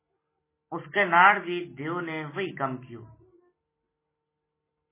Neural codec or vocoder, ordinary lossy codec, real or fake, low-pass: none; MP3, 16 kbps; real; 3.6 kHz